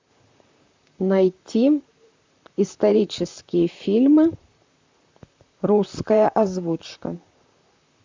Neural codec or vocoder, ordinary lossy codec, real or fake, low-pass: vocoder, 44.1 kHz, 128 mel bands, Pupu-Vocoder; MP3, 64 kbps; fake; 7.2 kHz